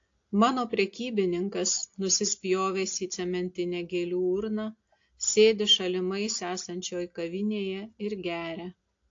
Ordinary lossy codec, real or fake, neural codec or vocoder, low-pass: AAC, 48 kbps; real; none; 7.2 kHz